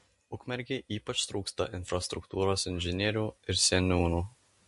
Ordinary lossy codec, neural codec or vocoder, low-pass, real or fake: MP3, 48 kbps; none; 14.4 kHz; real